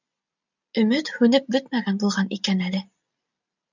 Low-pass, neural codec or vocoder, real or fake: 7.2 kHz; none; real